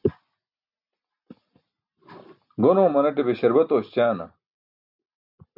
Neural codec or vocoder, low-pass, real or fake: none; 5.4 kHz; real